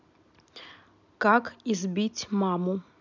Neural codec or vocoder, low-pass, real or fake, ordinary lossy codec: none; 7.2 kHz; real; none